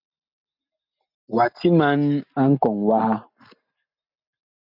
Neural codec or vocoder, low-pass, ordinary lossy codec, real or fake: none; 5.4 kHz; MP3, 48 kbps; real